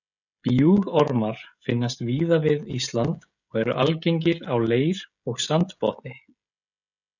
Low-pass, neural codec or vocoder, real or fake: 7.2 kHz; codec, 16 kHz, 16 kbps, FreqCodec, smaller model; fake